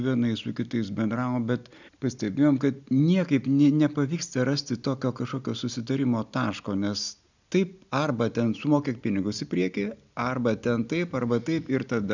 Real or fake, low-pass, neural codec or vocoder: real; 7.2 kHz; none